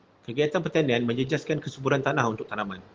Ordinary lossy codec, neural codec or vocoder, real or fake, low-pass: Opus, 16 kbps; none; real; 7.2 kHz